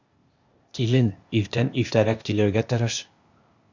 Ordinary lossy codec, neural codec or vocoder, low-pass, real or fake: Opus, 64 kbps; codec, 16 kHz, 0.8 kbps, ZipCodec; 7.2 kHz; fake